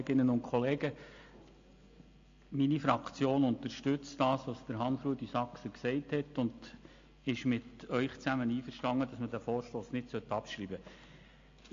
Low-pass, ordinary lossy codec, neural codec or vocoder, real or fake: 7.2 kHz; AAC, 48 kbps; none; real